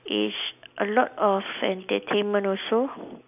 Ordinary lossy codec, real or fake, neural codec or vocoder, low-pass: none; real; none; 3.6 kHz